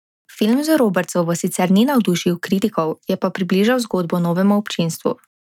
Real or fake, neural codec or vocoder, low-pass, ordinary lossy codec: real; none; 19.8 kHz; none